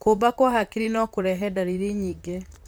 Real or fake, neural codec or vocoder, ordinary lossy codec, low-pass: fake; vocoder, 44.1 kHz, 128 mel bands, Pupu-Vocoder; none; none